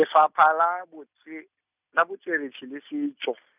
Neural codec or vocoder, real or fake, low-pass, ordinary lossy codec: none; real; 3.6 kHz; none